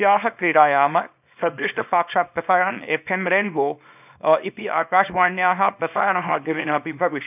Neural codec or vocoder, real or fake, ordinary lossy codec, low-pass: codec, 24 kHz, 0.9 kbps, WavTokenizer, small release; fake; none; 3.6 kHz